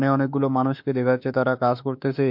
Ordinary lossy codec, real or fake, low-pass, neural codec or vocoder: MP3, 48 kbps; fake; 5.4 kHz; autoencoder, 48 kHz, 32 numbers a frame, DAC-VAE, trained on Japanese speech